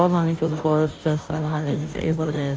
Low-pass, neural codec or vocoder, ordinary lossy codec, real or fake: none; codec, 16 kHz, 0.5 kbps, FunCodec, trained on Chinese and English, 25 frames a second; none; fake